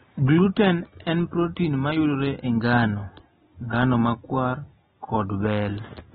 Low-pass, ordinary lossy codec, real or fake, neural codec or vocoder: 19.8 kHz; AAC, 16 kbps; real; none